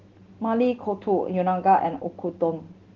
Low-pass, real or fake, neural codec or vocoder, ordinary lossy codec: 7.2 kHz; real; none; Opus, 16 kbps